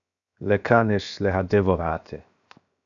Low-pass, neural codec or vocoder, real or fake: 7.2 kHz; codec, 16 kHz, 0.7 kbps, FocalCodec; fake